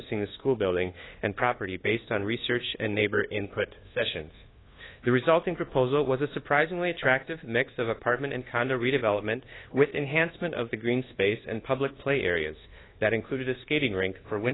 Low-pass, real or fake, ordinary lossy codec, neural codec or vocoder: 7.2 kHz; fake; AAC, 16 kbps; codec, 24 kHz, 1.2 kbps, DualCodec